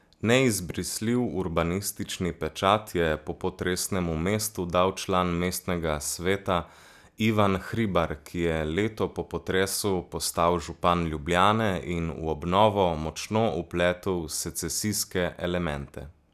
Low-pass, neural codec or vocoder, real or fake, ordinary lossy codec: 14.4 kHz; none; real; none